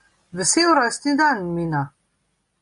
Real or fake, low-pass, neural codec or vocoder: real; 10.8 kHz; none